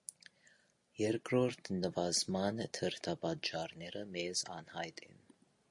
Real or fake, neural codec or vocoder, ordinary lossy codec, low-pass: real; none; MP3, 48 kbps; 10.8 kHz